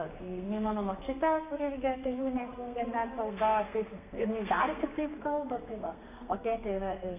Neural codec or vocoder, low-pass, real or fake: codec, 32 kHz, 1.9 kbps, SNAC; 3.6 kHz; fake